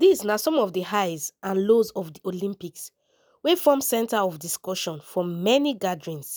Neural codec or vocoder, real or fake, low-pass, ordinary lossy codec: none; real; none; none